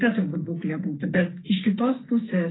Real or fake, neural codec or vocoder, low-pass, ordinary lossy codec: fake; codec, 44.1 kHz, 2.6 kbps, SNAC; 7.2 kHz; AAC, 16 kbps